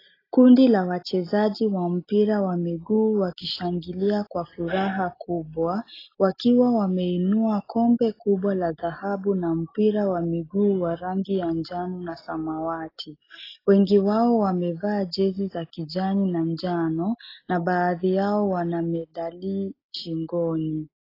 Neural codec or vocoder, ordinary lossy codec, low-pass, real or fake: none; AAC, 24 kbps; 5.4 kHz; real